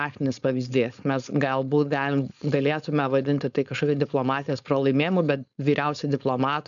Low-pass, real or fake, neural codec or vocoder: 7.2 kHz; fake; codec, 16 kHz, 4.8 kbps, FACodec